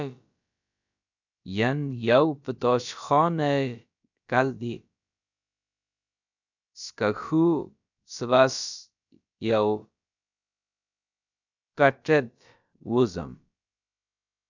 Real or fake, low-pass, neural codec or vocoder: fake; 7.2 kHz; codec, 16 kHz, about 1 kbps, DyCAST, with the encoder's durations